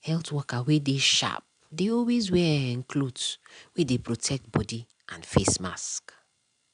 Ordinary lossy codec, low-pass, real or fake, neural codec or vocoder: none; 9.9 kHz; real; none